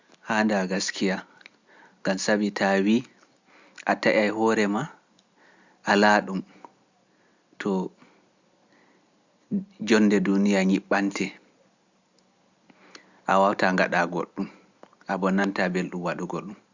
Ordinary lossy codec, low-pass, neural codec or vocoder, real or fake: Opus, 64 kbps; 7.2 kHz; none; real